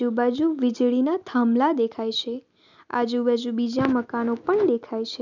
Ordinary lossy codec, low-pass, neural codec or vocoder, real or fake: none; 7.2 kHz; none; real